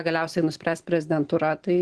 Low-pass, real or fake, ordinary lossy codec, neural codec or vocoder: 10.8 kHz; real; Opus, 16 kbps; none